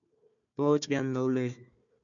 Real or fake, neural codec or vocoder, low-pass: fake; codec, 16 kHz, 1 kbps, FunCodec, trained on Chinese and English, 50 frames a second; 7.2 kHz